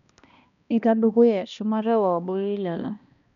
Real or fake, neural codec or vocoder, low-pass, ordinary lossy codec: fake; codec, 16 kHz, 1 kbps, X-Codec, HuBERT features, trained on balanced general audio; 7.2 kHz; none